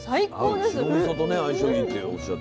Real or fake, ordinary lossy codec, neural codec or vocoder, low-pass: real; none; none; none